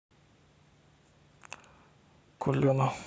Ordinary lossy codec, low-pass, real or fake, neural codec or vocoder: none; none; real; none